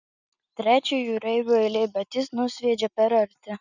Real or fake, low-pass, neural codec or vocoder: real; 7.2 kHz; none